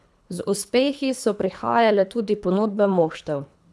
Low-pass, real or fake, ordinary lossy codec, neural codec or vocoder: none; fake; none; codec, 24 kHz, 3 kbps, HILCodec